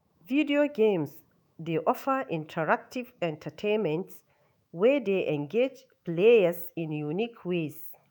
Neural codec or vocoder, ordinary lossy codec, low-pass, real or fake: autoencoder, 48 kHz, 128 numbers a frame, DAC-VAE, trained on Japanese speech; none; none; fake